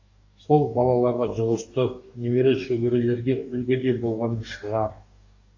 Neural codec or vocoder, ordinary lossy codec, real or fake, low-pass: codec, 44.1 kHz, 2.6 kbps, DAC; AAC, 48 kbps; fake; 7.2 kHz